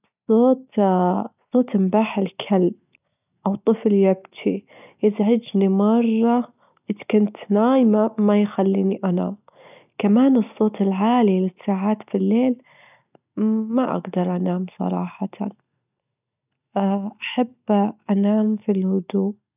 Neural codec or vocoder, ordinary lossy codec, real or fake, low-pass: none; none; real; 3.6 kHz